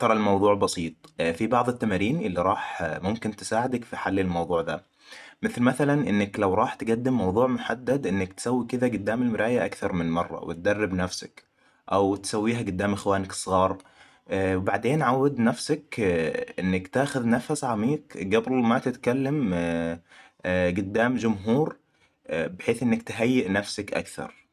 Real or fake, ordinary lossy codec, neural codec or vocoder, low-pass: real; none; none; 14.4 kHz